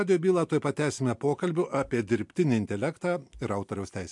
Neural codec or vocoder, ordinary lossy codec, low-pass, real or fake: none; MP3, 64 kbps; 10.8 kHz; real